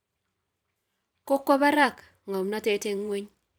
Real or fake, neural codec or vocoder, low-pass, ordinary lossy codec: fake; vocoder, 44.1 kHz, 128 mel bands every 512 samples, BigVGAN v2; none; none